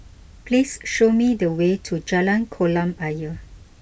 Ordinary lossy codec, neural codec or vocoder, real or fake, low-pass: none; none; real; none